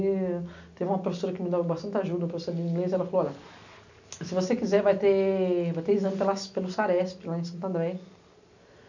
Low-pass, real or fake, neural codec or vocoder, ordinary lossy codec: 7.2 kHz; real; none; none